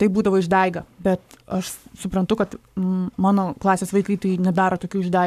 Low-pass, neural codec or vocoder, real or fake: 14.4 kHz; codec, 44.1 kHz, 7.8 kbps, Pupu-Codec; fake